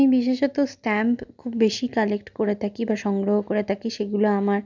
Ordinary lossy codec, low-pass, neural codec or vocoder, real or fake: none; 7.2 kHz; none; real